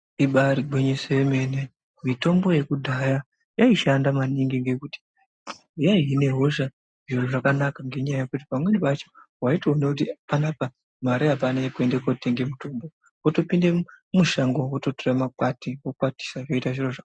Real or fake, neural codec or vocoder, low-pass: real; none; 9.9 kHz